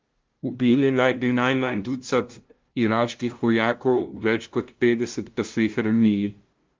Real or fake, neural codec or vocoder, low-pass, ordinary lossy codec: fake; codec, 16 kHz, 0.5 kbps, FunCodec, trained on LibriTTS, 25 frames a second; 7.2 kHz; Opus, 32 kbps